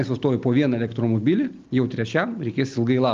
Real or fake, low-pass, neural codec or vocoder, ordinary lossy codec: real; 7.2 kHz; none; Opus, 32 kbps